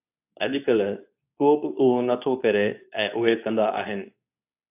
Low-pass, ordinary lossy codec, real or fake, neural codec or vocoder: 3.6 kHz; AAC, 32 kbps; fake; codec, 24 kHz, 0.9 kbps, WavTokenizer, medium speech release version 2